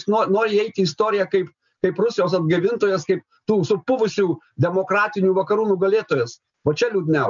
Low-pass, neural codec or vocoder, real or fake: 7.2 kHz; none; real